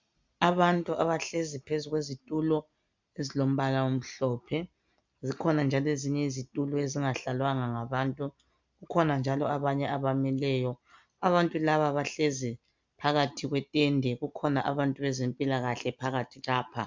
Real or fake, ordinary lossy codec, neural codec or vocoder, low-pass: real; MP3, 64 kbps; none; 7.2 kHz